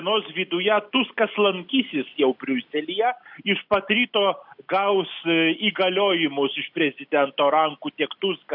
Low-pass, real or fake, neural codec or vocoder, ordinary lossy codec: 5.4 kHz; real; none; AAC, 48 kbps